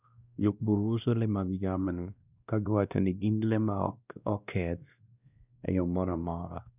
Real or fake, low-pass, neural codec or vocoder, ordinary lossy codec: fake; 3.6 kHz; codec, 16 kHz, 1 kbps, X-Codec, WavLM features, trained on Multilingual LibriSpeech; none